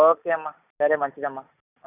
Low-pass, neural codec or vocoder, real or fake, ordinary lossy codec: 3.6 kHz; none; real; none